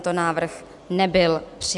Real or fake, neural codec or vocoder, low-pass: real; none; 10.8 kHz